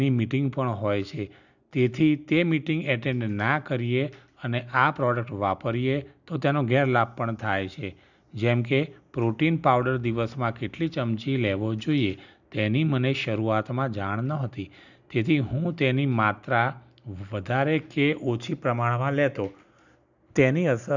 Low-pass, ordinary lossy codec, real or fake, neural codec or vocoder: 7.2 kHz; none; real; none